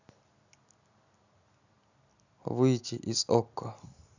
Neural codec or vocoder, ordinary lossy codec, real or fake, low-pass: none; none; real; 7.2 kHz